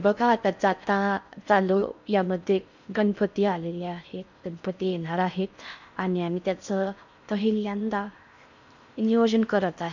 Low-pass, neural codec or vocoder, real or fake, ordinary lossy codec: 7.2 kHz; codec, 16 kHz in and 24 kHz out, 0.6 kbps, FocalCodec, streaming, 4096 codes; fake; none